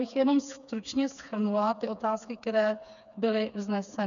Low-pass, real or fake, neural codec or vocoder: 7.2 kHz; fake; codec, 16 kHz, 4 kbps, FreqCodec, smaller model